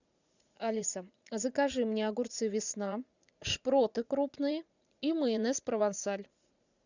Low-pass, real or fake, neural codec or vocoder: 7.2 kHz; fake; vocoder, 44.1 kHz, 80 mel bands, Vocos